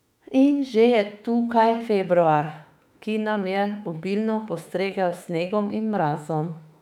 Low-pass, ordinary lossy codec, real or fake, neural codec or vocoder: 19.8 kHz; none; fake; autoencoder, 48 kHz, 32 numbers a frame, DAC-VAE, trained on Japanese speech